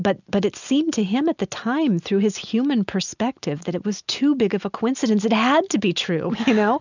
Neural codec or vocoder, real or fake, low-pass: none; real; 7.2 kHz